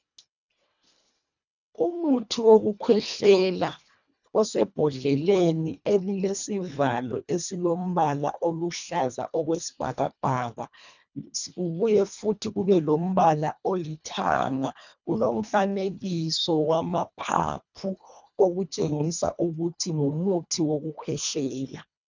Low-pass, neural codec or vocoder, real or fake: 7.2 kHz; codec, 24 kHz, 1.5 kbps, HILCodec; fake